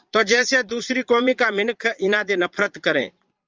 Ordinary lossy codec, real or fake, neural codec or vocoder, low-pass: Opus, 32 kbps; fake; vocoder, 22.05 kHz, 80 mel bands, Vocos; 7.2 kHz